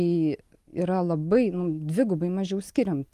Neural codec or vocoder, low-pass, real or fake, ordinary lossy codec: none; 14.4 kHz; real; Opus, 24 kbps